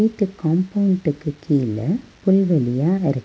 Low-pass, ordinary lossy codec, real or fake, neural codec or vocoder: none; none; real; none